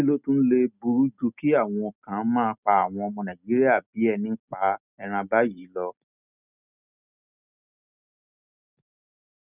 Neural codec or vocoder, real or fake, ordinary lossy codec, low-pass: none; real; none; 3.6 kHz